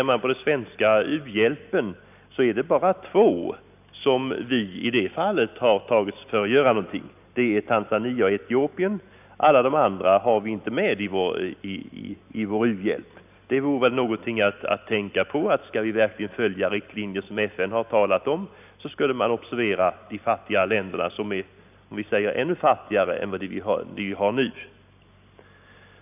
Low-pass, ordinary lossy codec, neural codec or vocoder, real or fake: 3.6 kHz; none; none; real